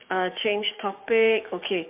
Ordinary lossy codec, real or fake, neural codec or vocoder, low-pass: MP3, 32 kbps; fake; codec, 16 kHz, 6 kbps, DAC; 3.6 kHz